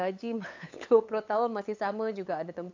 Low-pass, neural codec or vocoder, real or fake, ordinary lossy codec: 7.2 kHz; codec, 16 kHz, 8 kbps, FunCodec, trained on LibriTTS, 25 frames a second; fake; AAC, 48 kbps